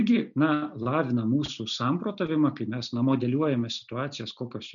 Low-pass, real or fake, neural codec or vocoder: 7.2 kHz; real; none